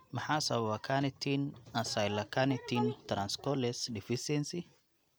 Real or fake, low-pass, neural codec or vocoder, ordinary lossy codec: real; none; none; none